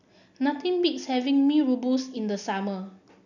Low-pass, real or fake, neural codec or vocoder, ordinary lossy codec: 7.2 kHz; real; none; none